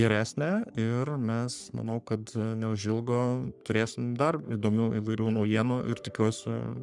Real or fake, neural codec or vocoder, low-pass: fake; codec, 44.1 kHz, 3.4 kbps, Pupu-Codec; 10.8 kHz